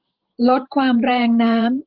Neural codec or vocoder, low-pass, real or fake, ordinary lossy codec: vocoder, 44.1 kHz, 128 mel bands every 512 samples, BigVGAN v2; 5.4 kHz; fake; Opus, 32 kbps